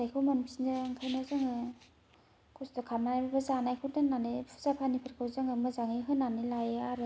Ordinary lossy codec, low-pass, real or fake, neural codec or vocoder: none; none; real; none